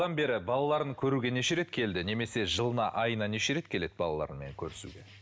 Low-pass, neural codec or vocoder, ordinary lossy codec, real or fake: none; none; none; real